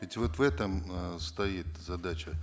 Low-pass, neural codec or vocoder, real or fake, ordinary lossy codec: none; none; real; none